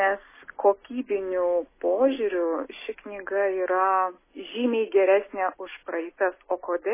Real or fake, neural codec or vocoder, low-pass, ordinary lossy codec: real; none; 3.6 kHz; MP3, 16 kbps